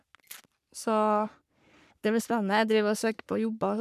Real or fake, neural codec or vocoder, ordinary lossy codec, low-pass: fake; codec, 44.1 kHz, 3.4 kbps, Pupu-Codec; none; 14.4 kHz